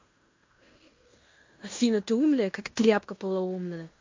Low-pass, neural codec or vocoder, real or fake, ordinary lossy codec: 7.2 kHz; codec, 16 kHz in and 24 kHz out, 0.9 kbps, LongCat-Audio-Codec, fine tuned four codebook decoder; fake; MP3, 48 kbps